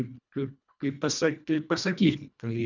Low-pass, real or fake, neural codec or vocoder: 7.2 kHz; fake; codec, 24 kHz, 1.5 kbps, HILCodec